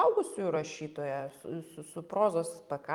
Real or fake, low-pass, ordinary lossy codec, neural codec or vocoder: fake; 19.8 kHz; Opus, 32 kbps; vocoder, 44.1 kHz, 128 mel bands every 256 samples, BigVGAN v2